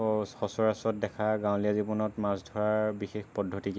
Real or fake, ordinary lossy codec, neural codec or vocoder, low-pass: real; none; none; none